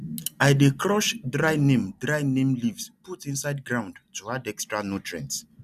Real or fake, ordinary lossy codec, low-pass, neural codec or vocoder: real; none; 14.4 kHz; none